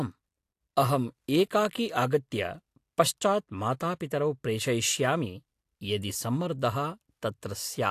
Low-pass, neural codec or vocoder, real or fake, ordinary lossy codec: 14.4 kHz; none; real; AAC, 64 kbps